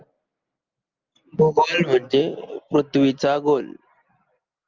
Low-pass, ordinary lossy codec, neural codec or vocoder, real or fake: 7.2 kHz; Opus, 24 kbps; none; real